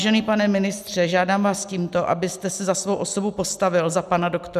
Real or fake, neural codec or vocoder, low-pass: real; none; 14.4 kHz